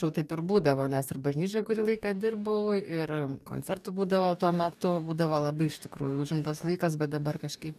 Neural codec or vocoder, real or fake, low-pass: codec, 44.1 kHz, 2.6 kbps, DAC; fake; 14.4 kHz